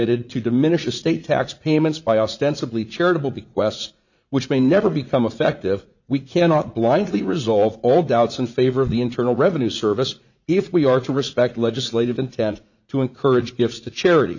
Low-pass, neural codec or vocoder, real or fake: 7.2 kHz; vocoder, 44.1 kHz, 80 mel bands, Vocos; fake